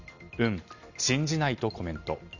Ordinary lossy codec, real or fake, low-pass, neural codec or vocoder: Opus, 64 kbps; real; 7.2 kHz; none